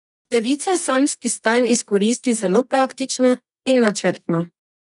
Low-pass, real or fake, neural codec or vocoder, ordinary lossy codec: 10.8 kHz; fake; codec, 24 kHz, 0.9 kbps, WavTokenizer, medium music audio release; none